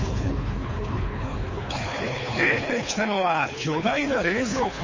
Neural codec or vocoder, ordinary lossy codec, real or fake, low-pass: codec, 24 kHz, 3 kbps, HILCodec; MP3, 32 kbps; fake; 7.2 kHz